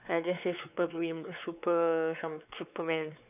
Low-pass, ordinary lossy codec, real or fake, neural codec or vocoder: 3.6 kHz; none; fake; codec, 16 kHz, 4 kbps, X-Codec, WavLM features, trained on Multilingual LibriSpeech